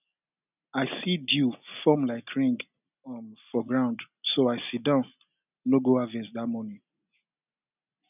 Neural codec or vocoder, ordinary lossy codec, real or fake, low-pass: none; none; real; 3.6 kHz